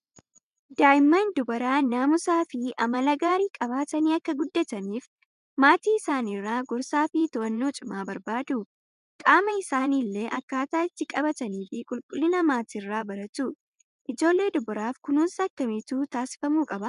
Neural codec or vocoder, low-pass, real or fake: vocoder, 24 kHz, 100 mel bands, Vocos; 10.8 kHz; fake